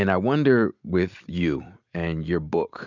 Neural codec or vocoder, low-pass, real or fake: none; 7.2 kHz; real